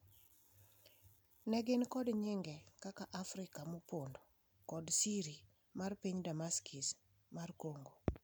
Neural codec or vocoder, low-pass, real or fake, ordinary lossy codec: none; none; real; none